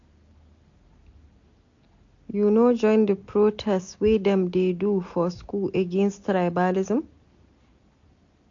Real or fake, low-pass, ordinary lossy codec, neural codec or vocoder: real; 7.2 kHz; AAC, 48 kbps; none